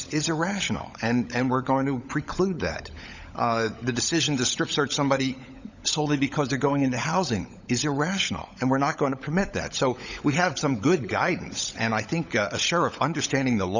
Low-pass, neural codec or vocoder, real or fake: 7.2 kHz; codec, 16 kHz, 16 kbps, FunCodec, trained on LibriTTS, 50 frames a second; fake